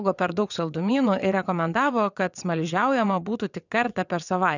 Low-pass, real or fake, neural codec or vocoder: 7.2 kHz; fake; vocoder, 22.05 kHz, 80 mel bands, WaveNeXt